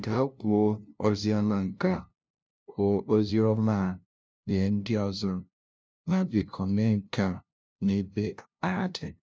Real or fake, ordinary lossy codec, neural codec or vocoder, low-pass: fake; none; codec, 16 kHz, 0.5 kbps, FunCodec, trained on LibriTTS, 25 frames a second; none